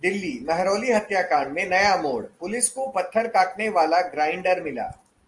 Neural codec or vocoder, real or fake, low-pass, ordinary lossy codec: none; real; 10.8 kHz; Opus, 24 kbps